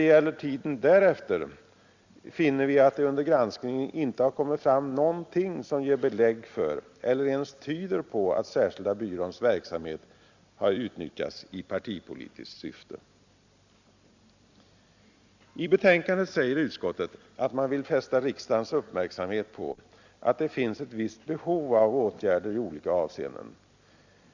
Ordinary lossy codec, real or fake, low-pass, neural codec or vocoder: Opus, 64 kbps; real; 7.2 kHz; none